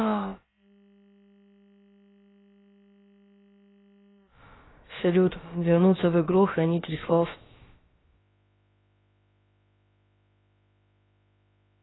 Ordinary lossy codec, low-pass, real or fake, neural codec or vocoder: AAC, 16 kbps; 7.2 kHz; fake; codec, 16 kHz, about 1 kbps, DyCAST, with the encoder's durations